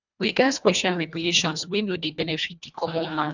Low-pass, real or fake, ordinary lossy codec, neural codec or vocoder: 7.2 kHz; fake; none; codec, 24 kHz, 1.5 kbps, HILCodec